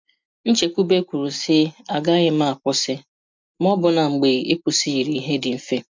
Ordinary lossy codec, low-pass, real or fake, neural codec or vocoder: MP3, 64 kbps; 7.2 kHz; real; none